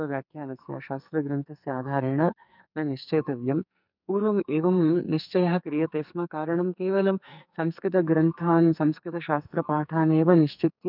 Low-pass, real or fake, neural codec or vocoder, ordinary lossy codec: 5.4 kHz; fake; codec, 44.1 kHz, 2.6 kbps, SNAC; none